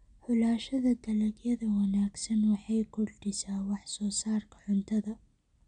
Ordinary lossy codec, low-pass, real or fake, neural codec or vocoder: none; 9.9 kHz; real; none